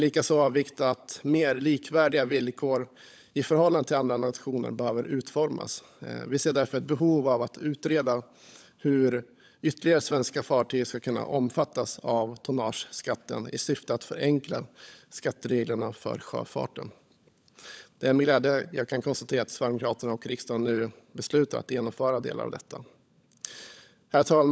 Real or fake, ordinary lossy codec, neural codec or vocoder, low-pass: fake; none; codec, 16 kHz, 16 kbps, FunCodec, trained on LibriTTS, 50 frames a second; none